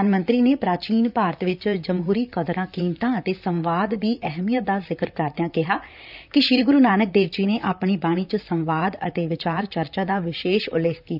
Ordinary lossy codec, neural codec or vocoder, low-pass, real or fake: none; vocoder, 44.1 kHz, 128 mel bands, Pupu-Vocoder; 5.4 kHz; fake